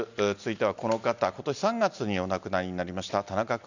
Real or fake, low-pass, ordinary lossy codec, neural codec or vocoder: real; 7.2 kHz; none; none